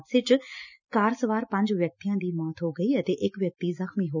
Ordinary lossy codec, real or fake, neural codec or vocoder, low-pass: none; real; none; 7.2 kHz